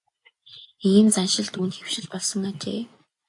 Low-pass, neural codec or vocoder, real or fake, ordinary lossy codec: 10.8 kHz; none; real; AAC, 64 kbps